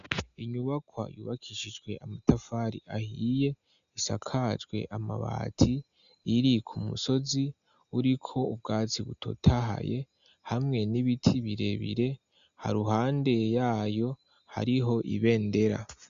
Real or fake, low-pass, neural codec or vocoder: real; 7.2 kHz; none